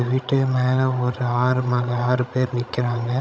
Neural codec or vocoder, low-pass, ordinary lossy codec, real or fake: codec, 16 kHz, 16 kbps, FreqCodec, larger model; none; none; fake